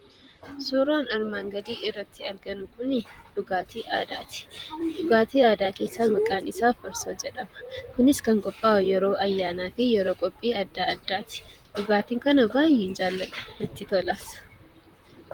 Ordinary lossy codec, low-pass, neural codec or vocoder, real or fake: Opus, 32 kbps; 19.8 kHz; vocoder, 44.1 kHz, 128 mel bands, Pupu-Vocoder; fake